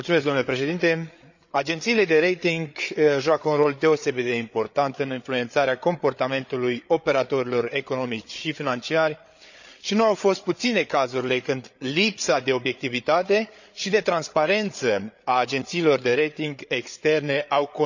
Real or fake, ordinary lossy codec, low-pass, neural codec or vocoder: fake; none; 7.2 kHz; codec, 16 kHz, 8 kbps, FreqCodec, larger model